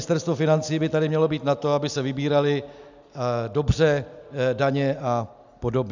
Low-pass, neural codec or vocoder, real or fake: 7.2 kHz; none; real